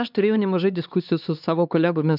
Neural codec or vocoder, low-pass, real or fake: codec, 16 kHz, 2 kbps, X-Codec, HuBERT features, trained on LibriSpeech; 5.4 kHz; fake